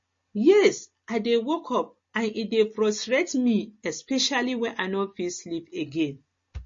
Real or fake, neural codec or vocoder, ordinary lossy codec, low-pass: real; none; MP3, 32 kbps; 7.2 kHz